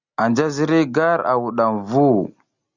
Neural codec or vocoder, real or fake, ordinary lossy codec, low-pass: none; real; Opus, 64 kbps; 7.2 kHz